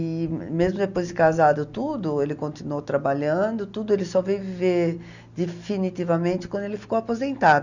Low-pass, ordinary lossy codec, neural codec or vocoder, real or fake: 7.2 kHz; none; none; real